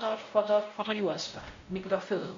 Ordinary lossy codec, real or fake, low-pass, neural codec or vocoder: MP3, 48 kbps; fake; 7.2 kHz; codec, 16 kHz, 0.5 kbps, X-Codec, WavLM features, trained on Multilingual LibriSpeech